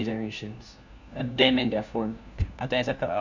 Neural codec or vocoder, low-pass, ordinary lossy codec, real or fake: codec, 16 kHz, 1 kbps, FunCodec, trained on LibriTTS, 50 frames a second; 7.2 kHz; none; fake